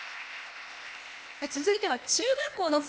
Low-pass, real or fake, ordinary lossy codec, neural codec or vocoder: none; fake; none; codec, 16 kHz, 0.8 kbps, ZipCodec